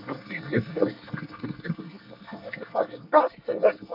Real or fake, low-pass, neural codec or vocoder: fake; 5.4 kHz; codec, 24 kHz, 1 kbps, SNAC